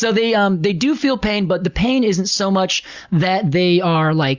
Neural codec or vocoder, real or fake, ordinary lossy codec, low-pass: none; real; Opus, 64 kbps; 7.2 kHz